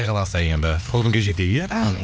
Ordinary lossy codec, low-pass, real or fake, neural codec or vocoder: none; none; fake; codec, 16 kHz, 2 kbps, X-Codec, HuBERT features, trained on LibriSpeech